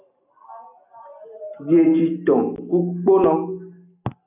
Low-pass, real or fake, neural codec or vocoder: 3.6 kHz; real; none